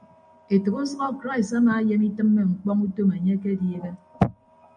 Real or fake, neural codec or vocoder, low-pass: real; none; 9.9 kHz